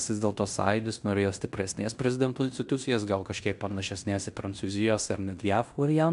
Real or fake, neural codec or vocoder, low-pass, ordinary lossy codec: fake; codec, 16 kHz in and 24 kHz out, 0.9 kbps, LongCat-Audio-Codec, fine tuned four codebook decoder; 10.8 kHz; MP3, 96 kbps